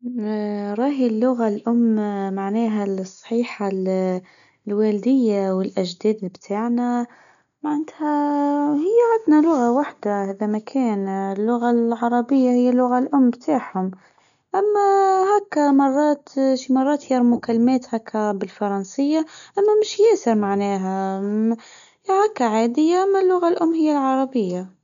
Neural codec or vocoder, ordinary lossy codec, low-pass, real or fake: none; none; 7.2 kHz; real